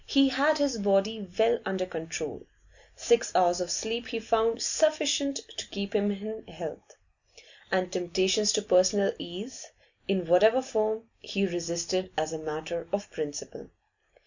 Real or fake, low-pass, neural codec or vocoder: real; 7.2 kHz; none